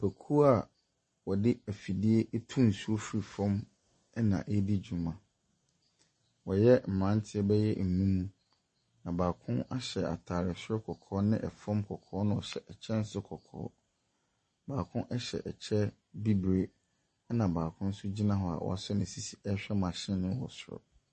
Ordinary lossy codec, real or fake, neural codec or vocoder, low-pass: MP3, 32 kbps; real; none; 10.8 kHz